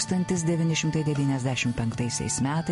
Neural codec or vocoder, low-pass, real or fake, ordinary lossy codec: none; 14.4 kHz; real; MP3, 48 kbps